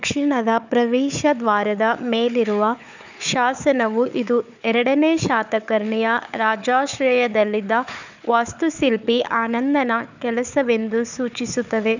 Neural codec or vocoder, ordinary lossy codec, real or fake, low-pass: codec, 16 kHz, 4 kbps, FunCodec, trained on Chinese and English, 50 frames a second; none; fake; 7.2 kHz